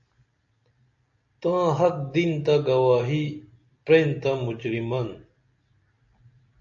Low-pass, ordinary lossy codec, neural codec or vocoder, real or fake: 7.2 kHz; AAC, 48 kbps; none; real